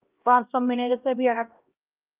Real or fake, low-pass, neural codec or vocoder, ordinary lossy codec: fake; 3.6 kHz; codec, 16 kHz, 1 kbps, X-Codec, HuBERT features, trained on LibriSpeech; Opus, 32 kbps